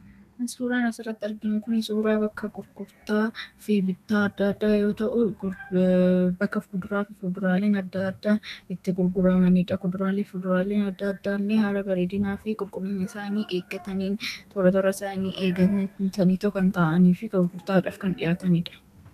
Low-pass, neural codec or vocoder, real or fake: 14.4 kHz; codec, 32 kHz, 1.9 kbps, SNAC; fake